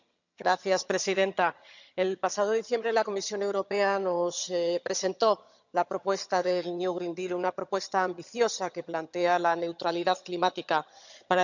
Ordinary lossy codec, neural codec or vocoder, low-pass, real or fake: none; vocoder, 22.05 kHz, 80 mel bands, HiFi-GAN; 7.2 kHz; fake